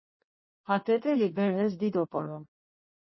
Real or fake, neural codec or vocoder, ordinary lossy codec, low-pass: fake; codec, 16 kHz in and 24 kHz out, 1.1 kbps, FireRedTTS-2 codec; MP3, 24 kbps; 7.2 kHz